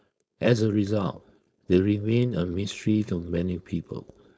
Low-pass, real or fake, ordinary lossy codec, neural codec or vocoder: none; fake; none; codec, 16 kHz, 4.8 kbps, FACodec